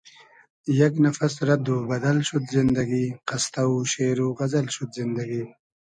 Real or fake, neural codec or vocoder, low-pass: real; none; 9.9 kHz